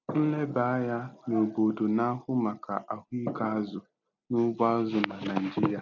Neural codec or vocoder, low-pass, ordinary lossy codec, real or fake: none; 7.2 kHz; none; real